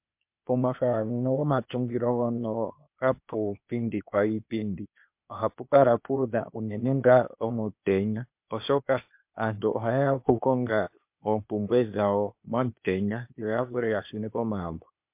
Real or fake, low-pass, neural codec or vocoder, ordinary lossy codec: fake; 3.6 kHz; codec, 16 kHz, 0.8 kbps, ZipCodec; MP3, 32 kbps